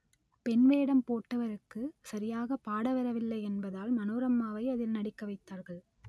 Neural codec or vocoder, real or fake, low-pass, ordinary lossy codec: none; real; none; none